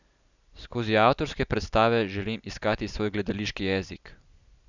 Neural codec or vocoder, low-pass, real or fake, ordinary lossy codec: none; 7.2 kHz; real; none